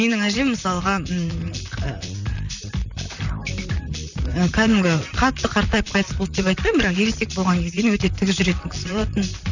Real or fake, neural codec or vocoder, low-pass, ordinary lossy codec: fake; vocoder, 44.1 kHz, 128 mel bands, Pupu-Vocoder; 7.2 kHz; none